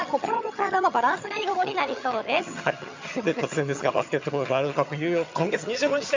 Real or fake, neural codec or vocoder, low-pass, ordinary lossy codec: fake; vocoder, 22.05 kHz, 80 mel bands, HiFi-GAN; 7.2 kHz; MP3, 64 kbps